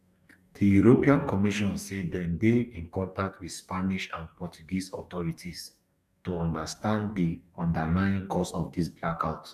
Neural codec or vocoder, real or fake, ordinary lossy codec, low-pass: codec, 44.1 kHz, 2.6 kbps, DAC; fake; none; 14.4 kHz